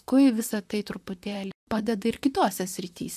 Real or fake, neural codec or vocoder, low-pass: fake; vocoder, 44.1 kHz, 128 mel bands, Pupu-Vocoder; 14.4 kHz